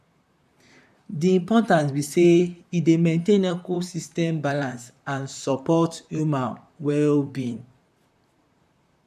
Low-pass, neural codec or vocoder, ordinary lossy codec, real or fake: 14.4 kHz; vocoder, 44.1 kHz, 128 mel bands, Pupu-Vocoder; none; fake